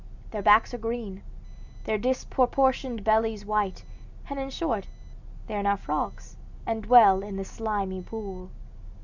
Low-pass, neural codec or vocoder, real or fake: 7.2 kHz; none; real